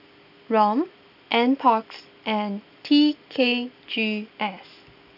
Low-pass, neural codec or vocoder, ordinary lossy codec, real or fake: 5.4 kHz; none; none; real